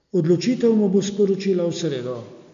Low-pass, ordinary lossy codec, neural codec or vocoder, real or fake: 7.2 kHz; AAC, 64 kbps; none; real